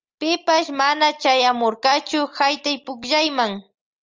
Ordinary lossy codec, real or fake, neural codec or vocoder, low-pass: Opus, 32 kbps; real; none; 7.2 kHz